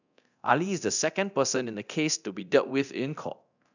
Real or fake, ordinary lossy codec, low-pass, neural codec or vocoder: fake; none; 7.2 kHz; codec, 24 kHz, 0.9 kbps, DualCodec